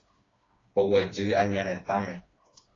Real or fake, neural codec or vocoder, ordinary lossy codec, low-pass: fake; codec, 16 kHz, 2 kbps, FreqCodec, smaller model; AAC, 32 kbps; 7.2 kHz